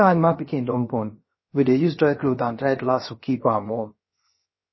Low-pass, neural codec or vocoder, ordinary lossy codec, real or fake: 7.2 kHz; codec, 16 kHz, 0.7 kbps, FocalCodec; MP3, 24 kbps; fake